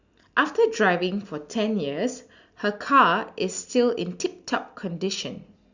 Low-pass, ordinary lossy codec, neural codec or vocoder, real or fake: 7.2 kHz; Opus, 64 kbps; none; real